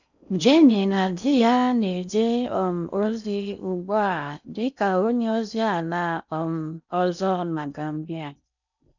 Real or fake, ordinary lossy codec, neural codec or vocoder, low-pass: fake; none; codec, 16 kHz in and 24 kHz out, 0.6 kbps, FocalCodec, streaming, 4096 codes; 7.2 kHz